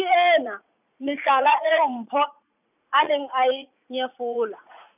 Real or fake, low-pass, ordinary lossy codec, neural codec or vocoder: fake; 3.6 kHz; none; vocoder, 44.1 kHz, 80 mel bands, Vocos